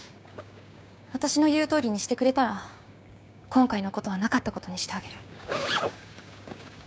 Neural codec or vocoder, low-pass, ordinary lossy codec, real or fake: codec, 16 kHz, 6 kbps, DAC; none; none; fake